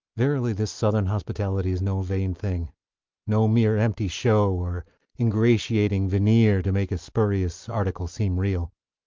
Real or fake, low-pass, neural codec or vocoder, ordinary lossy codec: real; 7.2 kHz; none; Opus, 32 kbps